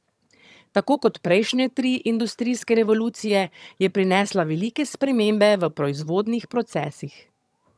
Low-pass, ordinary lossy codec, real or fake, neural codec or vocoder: none; none; fake; vocoder, 22.05 kHz, 80 mel bands, HiFi-GAN